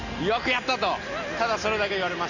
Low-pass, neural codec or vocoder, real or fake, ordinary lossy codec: 7.2 kHz; none; real; none